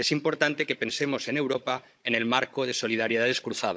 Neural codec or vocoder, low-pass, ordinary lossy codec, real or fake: codec, 16 kHz, 16 kbps, FunCodec, trained on Chinese and English, 50 frames a second; none; none; fake